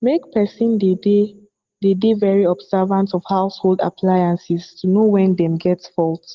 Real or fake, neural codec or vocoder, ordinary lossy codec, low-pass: real; none; Opus, 16 kbps; 7.2 kHz